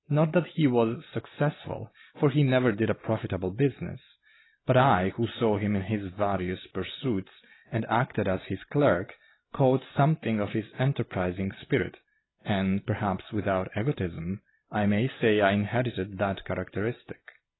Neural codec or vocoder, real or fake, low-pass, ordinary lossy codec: none; real; 7.2 kHz; AAC, 16 kbps